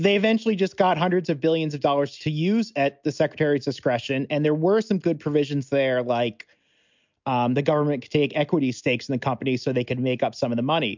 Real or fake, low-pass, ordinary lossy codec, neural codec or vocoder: real; 7.2 kHz; MP3, 64 kbps; none